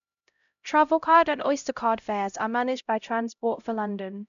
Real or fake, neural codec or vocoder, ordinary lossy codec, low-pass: fake; codec, 16 kHz, 0.5 kbps, X-Codec, HuBERT features, trained on LibriSpeech; none; 7.2 kHz